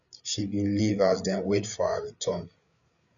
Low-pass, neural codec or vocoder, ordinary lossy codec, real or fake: 7.2 kHz; codec, 16 kHz, 8 kbps, FreqCodec, larger model; none; fake